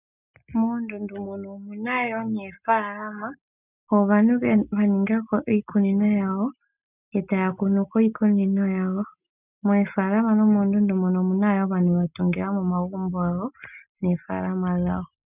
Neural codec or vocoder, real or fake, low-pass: none; real; 3.6 kHz